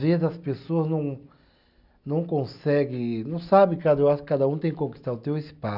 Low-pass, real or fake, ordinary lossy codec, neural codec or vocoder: 5.4 kHz; real; none; none